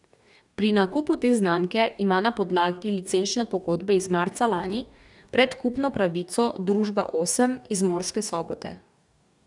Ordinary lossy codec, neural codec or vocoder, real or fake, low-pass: none; codec, 44.1 kHz, 2.6 kbps, DAC; fake; 10.8 kHz